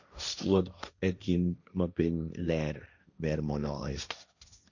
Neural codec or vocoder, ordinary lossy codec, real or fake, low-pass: codec, 16 kHz, 1.1 kbps, Voila-Tokenizer; none; fake; 7.2 kHz